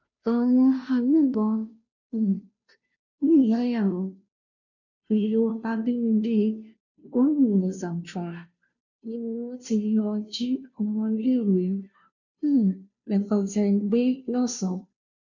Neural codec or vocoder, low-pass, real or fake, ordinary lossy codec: codec, 16 kHz, 0.5 kbps, FunCodec, trained on Chinese and English, 25 frames a second; 7.2 kHz; fake; none